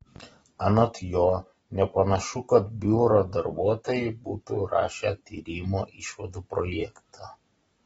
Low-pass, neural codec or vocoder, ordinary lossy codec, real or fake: 19.8 kHz; none; AAC, 24 kbps; real